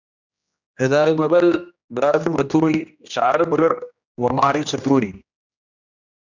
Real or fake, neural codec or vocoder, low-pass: fake; codec, 16 kHz, 1 kbps, X-Codec, HuBERT features, trained on general audio; 7.2 kHz